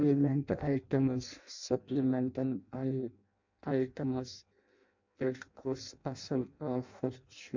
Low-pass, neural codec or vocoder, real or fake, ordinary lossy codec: 7.2 kHz; codec, 16 kHz in and 24 kHz out, 0.6 kbps, FireRedTTS-2 codec; fake; MP3, 48 kbps